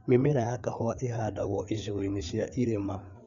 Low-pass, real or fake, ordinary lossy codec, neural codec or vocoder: 7.2 kHz; fake; none; codec, 16 kHz, 4 kbps, FreqCodec, larger model